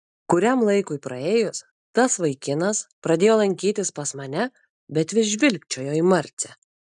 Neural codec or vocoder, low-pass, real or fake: none; 10.8 kHz; real